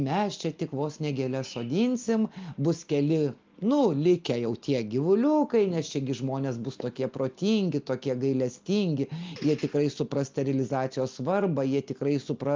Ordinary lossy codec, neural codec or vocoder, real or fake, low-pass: Opus, 24 kbps; none; real; 7.2 kHz